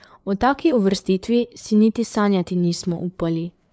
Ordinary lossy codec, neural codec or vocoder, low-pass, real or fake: none; codec, 16 kHz, 4 kbps, FreqCodec, larger model; none; fake